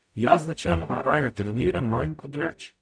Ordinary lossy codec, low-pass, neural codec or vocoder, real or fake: none; 9.9 kHz; codec, 44.1 kHz, 0.9 kbps, DAC; fake